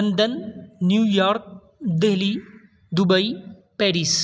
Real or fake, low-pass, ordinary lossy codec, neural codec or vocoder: real; none; none; none